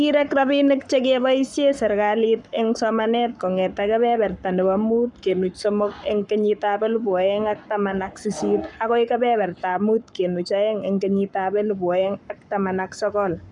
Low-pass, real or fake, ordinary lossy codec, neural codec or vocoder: 10.8 kHz; fake; none; codec, 44.1 kHz, 7.8 kbps, Pupu-Codec